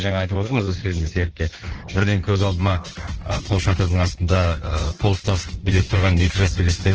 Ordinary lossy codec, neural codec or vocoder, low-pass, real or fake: Opus, 16 kbps; codec, 16 kHz in and 24 kHz out, 1.1 kbps, FireRedTTS-2 codec; 7.2 kHz; fake